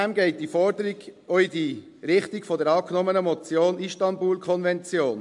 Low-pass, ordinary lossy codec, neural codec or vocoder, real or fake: 10.8 kHz; MP3, 64 kbps; none; real